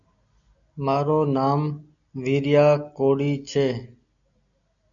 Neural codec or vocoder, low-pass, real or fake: none; 7.2 kHz; real